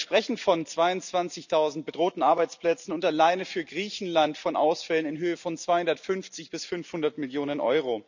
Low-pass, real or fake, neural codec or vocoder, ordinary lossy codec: 7.2 kHz; real; none; none